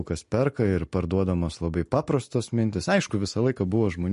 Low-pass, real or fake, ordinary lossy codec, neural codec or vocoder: 10.8 kHz; real; MP3, 48 kbps; none